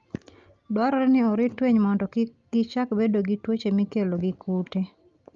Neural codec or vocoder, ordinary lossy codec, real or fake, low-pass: none; Opus, 32 kbps; real; 7.2 kHz